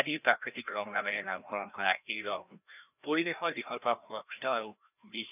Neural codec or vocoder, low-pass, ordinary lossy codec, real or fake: codec, 16 kHz, 1 kbps, FreqCodec, larger model; 3.6 kHz; none; fake